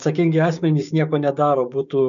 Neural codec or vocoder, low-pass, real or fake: codec, 16 kHz, 6 kbps, DAC; 7.2 kHz; fake